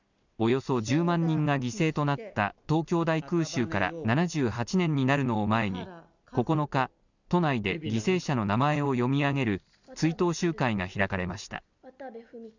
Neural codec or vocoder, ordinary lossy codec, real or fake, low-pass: none; none; real; 7.2 kHz